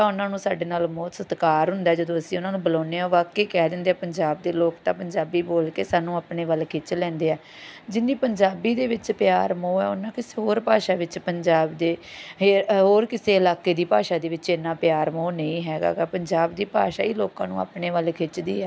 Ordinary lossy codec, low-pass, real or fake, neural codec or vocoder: none; none; real; none